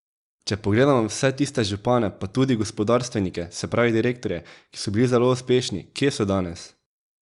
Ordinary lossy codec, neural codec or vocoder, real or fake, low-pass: Opus, 64 kbps; none; real; 10.8 kHz